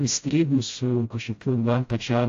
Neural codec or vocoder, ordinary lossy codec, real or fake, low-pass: codec, 16 kHz, 0.5 kbps, FreqCodec, smaller model; AAC, 48 kbps; fake; 7.2 kHz